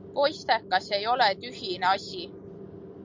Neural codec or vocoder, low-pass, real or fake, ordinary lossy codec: none; 7.2 kHz; real; MP3, 64 kbps